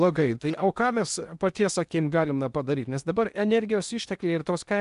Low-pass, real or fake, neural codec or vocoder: 10.8 kHz; fake; codec, 16 kHz in and 24 kHz out, 0.8 kbps, FocalCodec, streaming, 65536 codes